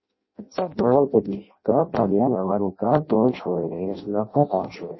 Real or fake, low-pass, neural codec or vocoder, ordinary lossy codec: fake; 7.2 kHz; codec, 16 kHz in and 24 kHz out, 0.6 kbps, FireRedTTS-2 codec; MP3, 24 kbps